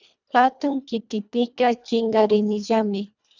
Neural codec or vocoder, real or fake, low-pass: codec, 24 kHz, 1.5 kbps, HILCodec; fake; 7.2 kHz